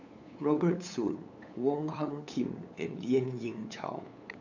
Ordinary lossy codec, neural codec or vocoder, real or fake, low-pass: AAC, 48 kbps; codec, 16 kHz, 8 kbps, FunCodec, trained on LibriTTS, 25 frames a second; fake; 7.2 kHz